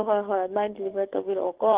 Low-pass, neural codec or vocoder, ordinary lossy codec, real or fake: 3.6 kHz; codec, 44.1 kHz, 7.8 kbps, DAC; Opus, 16 kbps; fake